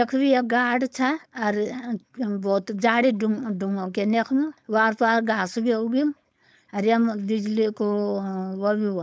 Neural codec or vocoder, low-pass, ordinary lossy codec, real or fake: codec, 16 kHz, 4.8 kbps, FACodec; none; none; fake